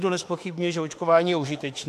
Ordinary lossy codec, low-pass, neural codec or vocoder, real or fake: AAC, 64 kbps; 14.4 kHz; autoencoder, 48 kHz, 32 numbers a frame, DAC-VAE, trained on Japanese speech; fake